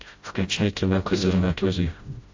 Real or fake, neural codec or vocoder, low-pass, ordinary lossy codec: fake; codec, 16 kHz, 0.5 kbps, FreqCodec, smaller model; 7.2 kHz; AAC, 48 kbps